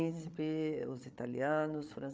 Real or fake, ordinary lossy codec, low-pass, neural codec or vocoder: fake; none; none; codec, 16 kHz, 8 kbps, FreqCodec, larger model